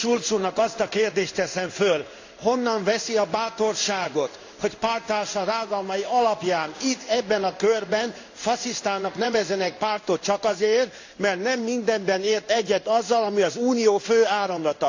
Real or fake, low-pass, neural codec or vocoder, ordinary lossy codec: fake; 7.2 kHz; codec, 16 kHz in and 24 kHz out, 1 kbps, XY-Tokenizer; AAC, 48 kbps